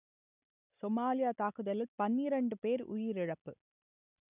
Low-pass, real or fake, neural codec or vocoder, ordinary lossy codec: 3.6 kHz; real; none; none